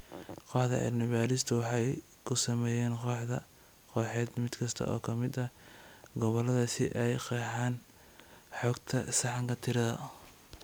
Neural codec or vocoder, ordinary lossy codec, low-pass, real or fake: none; none; none; real